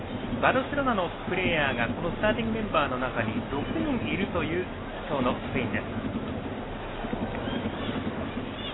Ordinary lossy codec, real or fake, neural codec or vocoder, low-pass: AAC, 16 kbps; real; none; 7.2 kHz